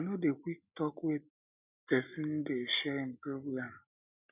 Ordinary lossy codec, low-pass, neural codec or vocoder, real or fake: none; 3.6 kHz; none; real